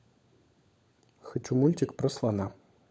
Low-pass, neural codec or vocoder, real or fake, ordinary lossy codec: none; codec, 16 kHz, 16 kbps, FunCodec, trained on LibriTTS, 50 frames a second; fake; none